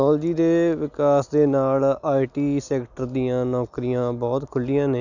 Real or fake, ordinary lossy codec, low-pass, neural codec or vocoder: real; none; 7.2 kHz; none